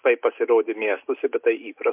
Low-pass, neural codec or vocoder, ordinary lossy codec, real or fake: 3.6 kHz; none; MP3, 32 kbps; real